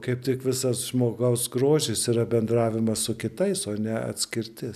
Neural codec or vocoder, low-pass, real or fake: none; 14.4 kHz; real